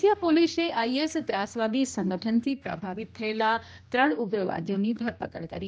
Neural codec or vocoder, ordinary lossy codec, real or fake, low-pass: codec, 16 kHz, 1 kbps, X-Codec, HuBERT features, trained on general audio; none; fake; none